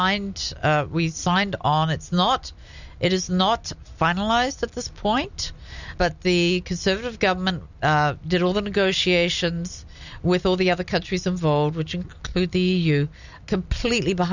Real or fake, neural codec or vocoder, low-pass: real; none; 7.2 kHz